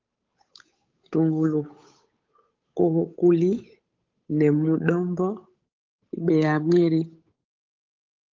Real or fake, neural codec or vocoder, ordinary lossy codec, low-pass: fake; codec, 16 kHz, 8 kbps, FunCodec, trained on Chinese and English, 25 frames a second; Opus, 24 kbps; 7.2 kHz